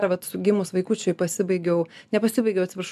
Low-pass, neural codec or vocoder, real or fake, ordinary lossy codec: 14.4 kHz; none; real; AAC, 96 kbps